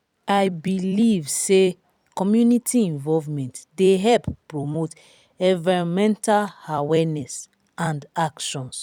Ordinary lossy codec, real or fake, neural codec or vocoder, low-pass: none; fake; vocoder, 44.1 kHz, 128 mel bands every 256 samples, BigVGAN v2; 19.8 kHz